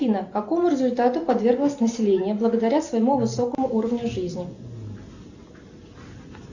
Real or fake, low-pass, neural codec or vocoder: real; 7.2 kHz; none